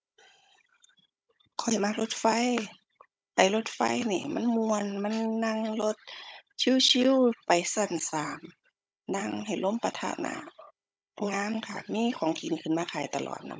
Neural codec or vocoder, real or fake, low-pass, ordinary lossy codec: codec, 16 kHz, 16 kbps, FunCodec, trained on Chinese and English, 50 frames a second; fake; none; none